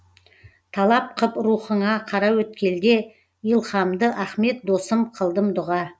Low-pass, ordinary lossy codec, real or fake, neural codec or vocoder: none; none; real; none